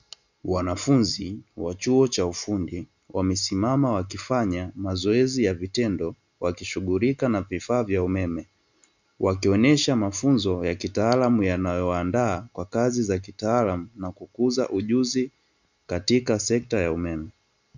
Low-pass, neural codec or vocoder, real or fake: 7.2 kHz; none; real